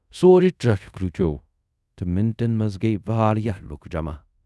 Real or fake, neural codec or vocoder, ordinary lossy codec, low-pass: fake; codec, 24 kHz, 0.5 kbps, DualCodec; none; none